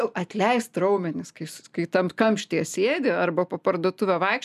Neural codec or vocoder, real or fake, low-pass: none; real; 14.4 kHz